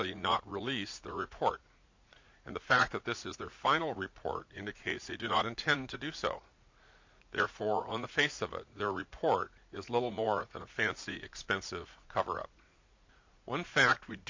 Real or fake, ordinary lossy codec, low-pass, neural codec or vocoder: fake; MP3, 64 kbps; 7.2 kHz; vocoder, 44.1 kHz, 80 mel bands, Vocos